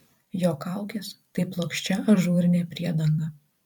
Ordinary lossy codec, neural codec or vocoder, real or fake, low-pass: MP3, 96 kbps; vocoder, 44.1 kHz, 128 mel bands every 256 samples, BigVGAN v2; fake; 19.8 kHz